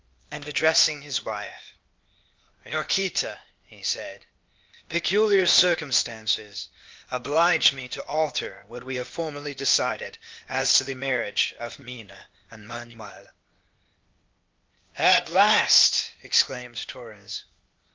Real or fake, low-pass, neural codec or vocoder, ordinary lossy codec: fake; 7.2 kHz; codec, 16 kHz, 0.8 kbps, ZipCodec; Opus, 24 kbps